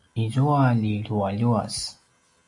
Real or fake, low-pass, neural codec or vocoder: real; 10.8 kHz; none